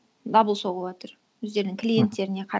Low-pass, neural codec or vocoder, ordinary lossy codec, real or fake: none; none; none; real